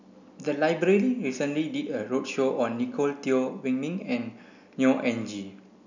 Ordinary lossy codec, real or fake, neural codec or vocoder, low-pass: none; real; none; 7.2 kHz